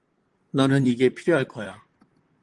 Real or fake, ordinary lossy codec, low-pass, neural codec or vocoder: fake; Opus, 24 kbps; 10.8 kHz; vocoder, 44.1 kHz, 128 mel bands, Pupu-Vocoder